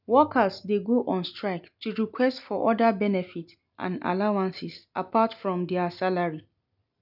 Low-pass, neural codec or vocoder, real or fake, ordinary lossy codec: 5.4 kHz; none; real; none